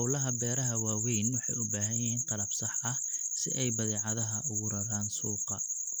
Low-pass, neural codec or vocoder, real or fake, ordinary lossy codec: none; none; real; none